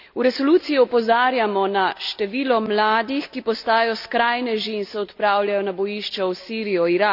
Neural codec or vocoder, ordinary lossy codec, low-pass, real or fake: none; none; 5.4 kHz; real